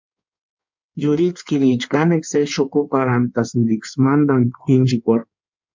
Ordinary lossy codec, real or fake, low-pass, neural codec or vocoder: MP3, 64 kbps; fake; 7.2 kHz; codec, 16 kHz in and 24 kHz out, 1.1 kbps, FireRedTTS-2 codec